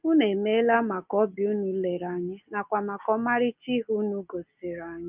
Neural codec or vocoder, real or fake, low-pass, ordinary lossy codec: none; real; 3.6 kHz; Opus, 32 kbps